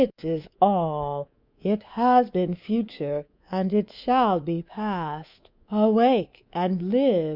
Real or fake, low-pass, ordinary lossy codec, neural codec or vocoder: fake; 5.4 kHz; Opus, 64 kbps; codec, 24 kHz, 3.1 kbps, DualCodec